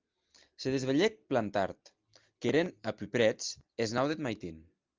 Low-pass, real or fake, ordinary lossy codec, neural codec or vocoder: 7.2 kHz; real; Opus, 24 kbps; none